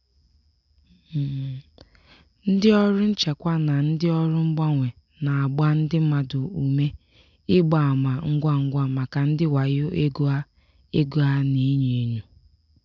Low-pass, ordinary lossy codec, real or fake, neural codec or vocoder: 7.2 kHz; none; real; none